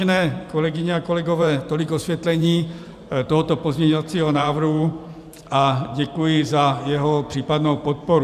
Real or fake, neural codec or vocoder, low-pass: fake; vocoder, 44.1 kHz, 128 mel bands every 512 samples, BigVGAN v2; 14.4 kHz